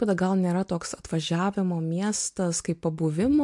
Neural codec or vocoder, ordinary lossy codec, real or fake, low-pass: none; MP3, 64 kbps; real; 10.8 kHz